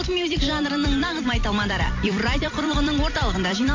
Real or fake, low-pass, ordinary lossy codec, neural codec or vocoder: fake; 7.2 kHz; MP3, 48 kbps; vocoder, 44.1 kHz, 128 mel bands every 256 samples, BigVGAN v2